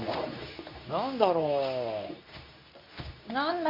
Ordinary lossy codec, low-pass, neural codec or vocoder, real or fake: MP3, 48 kbps; 5.4 kHz; codec, 24 kHz, 0.9 kbps, WavTokenizer, medium speech release version 2; fake